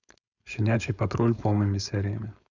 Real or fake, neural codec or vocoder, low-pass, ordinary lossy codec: fake; codec, 16 kHz, 4.8 kbps, FACodec; 7.2 kHz; none